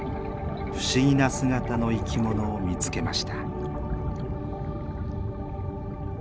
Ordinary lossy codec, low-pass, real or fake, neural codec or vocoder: none; none; real; none